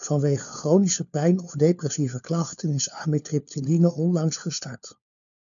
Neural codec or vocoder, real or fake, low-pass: codec, 16 kHz, 4 kbps, FunCodec, trained on LibriTTS, 50 frames a second; fake; 7.2 kHz